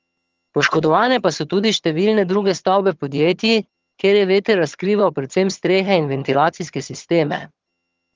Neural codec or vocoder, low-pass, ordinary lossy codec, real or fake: vocoder, 22.05 kHz, 80 mel bands, HiFi-GAN; 7.2 kHz; Opus, 24 kbps; fake